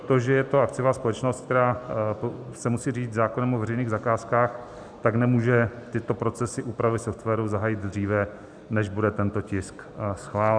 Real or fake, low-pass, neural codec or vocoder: real; 9.9 kHz; none